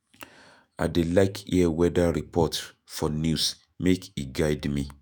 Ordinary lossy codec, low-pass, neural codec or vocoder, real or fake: none; none; autoencoder, 48 kHz, 128 numbers a frame, DAC-VAE, trained on Japanese speech; fake